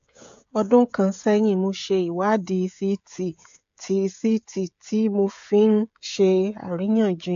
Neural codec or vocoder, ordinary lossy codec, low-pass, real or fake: codec, 16 kHz, 16 kbps, FreqCodec, smaller model; AAC, 64 kbps; 7.2 kHz; fake